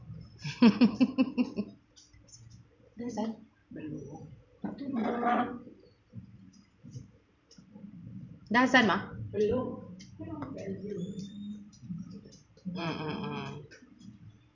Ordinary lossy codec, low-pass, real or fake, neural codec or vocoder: none; 7.2 kHz; fake; vocoder, 44.1 kHz, 128 mel bands every 512 samples, BigVGAN v2